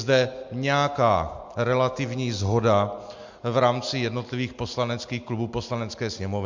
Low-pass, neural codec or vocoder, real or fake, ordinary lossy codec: 7.2 kHz; none; real; MP3, 64 kbps